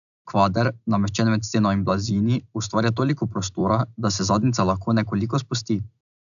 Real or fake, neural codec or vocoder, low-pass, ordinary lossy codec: real; none; 7.2 kHz; none